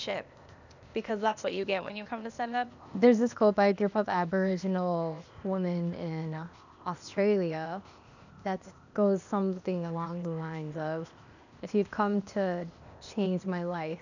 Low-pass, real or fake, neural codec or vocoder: 7.2 kHz; fake; codec, 16 kHz, 0.8 kbps, ZipCodec